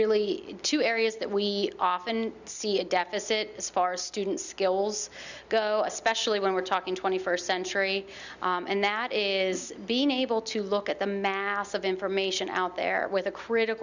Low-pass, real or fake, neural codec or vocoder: 7.2 kHz; real; none